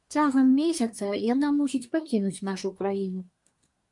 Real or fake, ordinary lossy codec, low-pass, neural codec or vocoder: fake; MP3, 64 kbps; 10.8 kHz; codec, 24 kHz, 1 kbps, SNAC